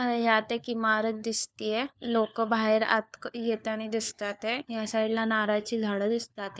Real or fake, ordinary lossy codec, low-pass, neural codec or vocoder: fake; none; none; codec, 16 kHz, 4 kbps, FunCodec, trained on LibriTTS, 50 frames a second